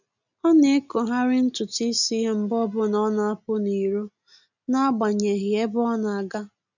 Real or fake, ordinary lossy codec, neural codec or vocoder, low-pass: real; none; none; 7.2 kHz